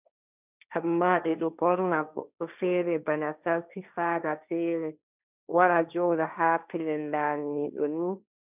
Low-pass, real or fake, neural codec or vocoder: 3.6 kHz; fake; codec, 16 kHz, 1.1 kbps, Voila-Tokenizer